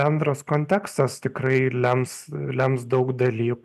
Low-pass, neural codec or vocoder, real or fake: 14.4 kHz; none; real